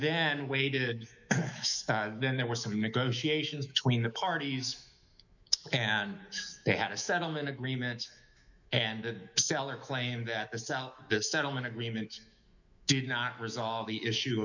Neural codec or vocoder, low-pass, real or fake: autoencoder, 48 kHz, 128 numbers a frame, DAC-VAE, trained on Japanese speech; 7.2 kHz; fake